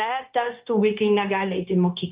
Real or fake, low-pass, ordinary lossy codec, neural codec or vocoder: fake; 3.6 kHz; Opus, 32 kbps; codec, 16 kHz, 0.9 kbps, LongCat-Audio-Codec